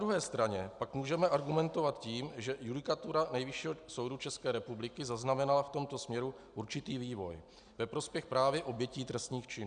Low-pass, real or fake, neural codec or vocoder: 9.9 kHz; real; none